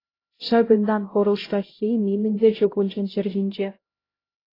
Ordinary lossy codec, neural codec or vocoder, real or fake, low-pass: AAC, 24 kbps; codec, 16 kHz, 0.5 kbps, X-Codec, HuBERT features, trained on LibriSpeech; fake; 5.4 kHz